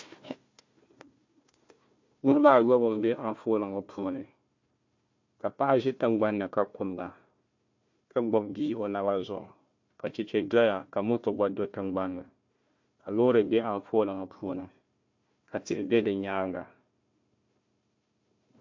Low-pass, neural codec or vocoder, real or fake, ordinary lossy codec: 7.2 kHz; codec, 16 kHz, 1 kbps, FunCodec, trained on Chinese and English, 50 frames a second; fake; MP3, 48 kbps